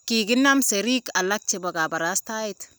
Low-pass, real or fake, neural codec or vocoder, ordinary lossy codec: none; real; none; none